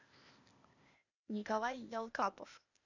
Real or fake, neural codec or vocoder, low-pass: fake; codec, 16 kHz, 0.8 kbps, ZipCodec; 7.2 kHz